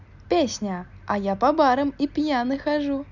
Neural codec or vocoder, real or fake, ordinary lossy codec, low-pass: none; real; none; 7.2 kHz